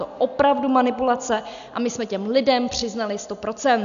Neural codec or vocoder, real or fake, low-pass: none; real; 7.2 kHz